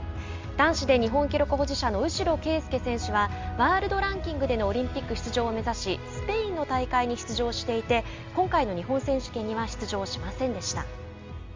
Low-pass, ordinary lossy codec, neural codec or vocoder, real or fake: 7.2 kHz; Opus, 32 kbps; none; real